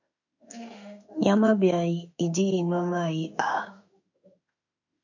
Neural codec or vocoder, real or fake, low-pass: autoencoder, 48 kHz, 32 numbers a frame, DAC-VAE, trained on Japanese speech; fake; 7.2 kHz